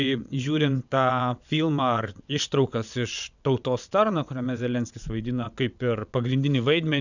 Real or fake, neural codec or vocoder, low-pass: fake; vocoder, 22.05 kHz, 80 mel bands, WaveNeXt; 7.2 kHz